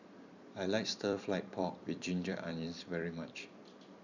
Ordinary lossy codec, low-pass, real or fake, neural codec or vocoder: none; 7.2 kHz; fake; vocoder, 44.1 kHz, 128 mel bands every 256 samples, BigVGAN v2